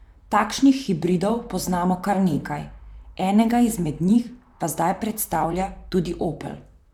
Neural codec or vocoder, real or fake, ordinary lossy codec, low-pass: vocoder, 44.1 kHz, 128 mel bands, Pupu-Vocoder; fake; none; 19.8 kHz